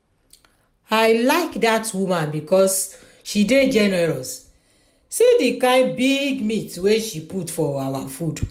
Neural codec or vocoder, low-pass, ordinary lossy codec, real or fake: none; 19.8 kHz; MP3, 96 kbps; real